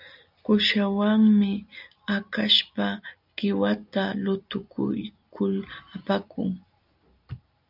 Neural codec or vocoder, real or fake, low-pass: none; real; 5.4 kHz